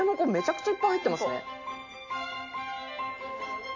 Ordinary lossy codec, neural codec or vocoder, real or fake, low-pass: none; none; real; 7.2 kHz